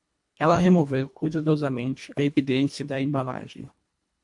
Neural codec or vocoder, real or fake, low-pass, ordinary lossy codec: codec, 24 kHz, 1.5 kbps, HILCodec; fake; 10.8 kHz; MP3, 64 kbps